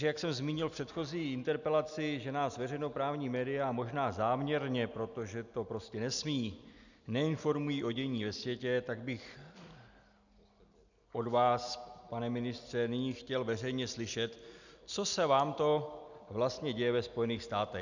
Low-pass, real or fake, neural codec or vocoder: 7.2 kHz; real; none